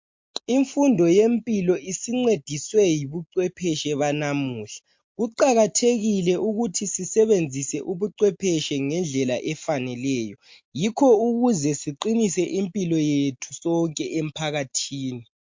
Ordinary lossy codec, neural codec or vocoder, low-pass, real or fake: MP3, 48 kbps; none; 7.2 kHz; real